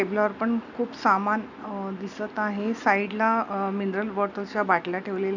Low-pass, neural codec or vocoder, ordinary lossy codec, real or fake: 7.2 kHz; none; none; real